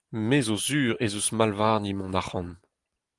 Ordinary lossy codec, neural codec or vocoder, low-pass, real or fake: Opus, 24 kbps; none; 10.8 kHz; real